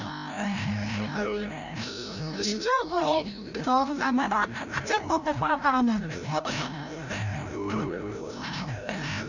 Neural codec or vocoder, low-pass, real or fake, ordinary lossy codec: codec, 16 kHz, 0.5 kbps, FreqCodec, larger model; 7.2 kHz; fake; none